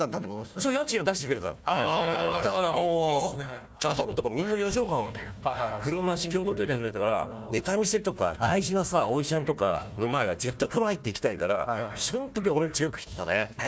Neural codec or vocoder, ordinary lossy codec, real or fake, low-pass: codec, 16 kHz, 1 kbps, FunCodec, trained on Chinese and English, 50 frames a second; none; fake; none